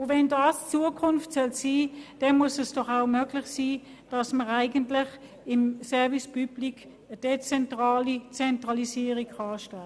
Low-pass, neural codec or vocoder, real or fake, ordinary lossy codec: none; none; real; none